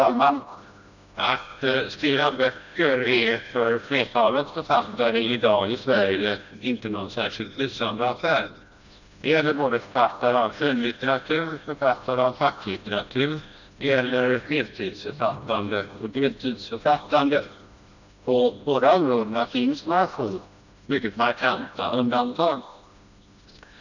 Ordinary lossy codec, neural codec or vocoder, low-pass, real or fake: none; codec, 16 kHz, 1 kbps, FreqCodec, smaller model; 7.2 kHz; fake